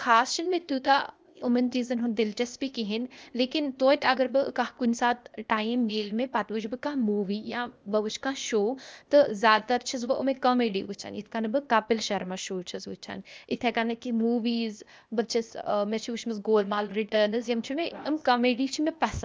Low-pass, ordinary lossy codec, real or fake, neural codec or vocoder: none; none; fake; codec, 16 kHz, 0.8 kbps, ZipCodec